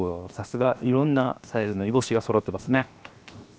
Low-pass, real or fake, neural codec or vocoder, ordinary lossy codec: none; fake; codec, 16 kHz, 0.7 kbps, FocalCodec; none